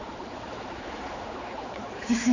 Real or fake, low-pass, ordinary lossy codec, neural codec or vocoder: fake; 7.2 kHz; none; codec, 16 kHz, 4 kbps, X-Codec, HuBERT features, trained on general audio